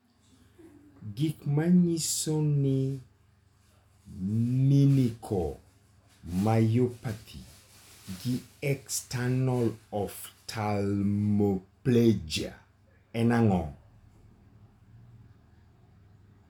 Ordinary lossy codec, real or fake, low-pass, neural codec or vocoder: none; real; 19.8 kHz; none